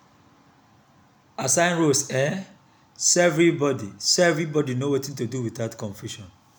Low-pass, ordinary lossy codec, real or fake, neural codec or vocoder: none; none; real; none